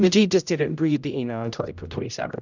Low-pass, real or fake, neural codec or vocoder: 7.2 kHz; fake; codec, 16 kHz, 0.5 kbps, X-Codec, HuBERT features, trained on general audio